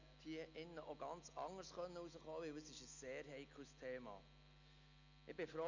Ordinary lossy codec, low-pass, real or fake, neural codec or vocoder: none; 7.2 kHz; real; none